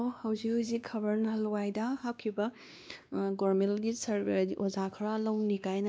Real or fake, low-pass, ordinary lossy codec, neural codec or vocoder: fake; none; none; codec, 16 kHz, 2 kbps, X-Codec, WavLM features, trained on Multilingual LibriSpeech